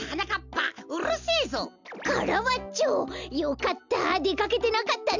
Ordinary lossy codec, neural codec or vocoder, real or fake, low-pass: none; none; real; 7.2 kHz